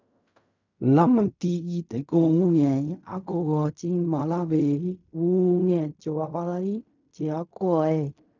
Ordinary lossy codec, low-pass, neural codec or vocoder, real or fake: none; 7.2 kHz; codec, 16 kHz in and 24 kHz out, 0.4 kbps, LongCat-Audio-Codec, fine tuned four codebook decoder; fake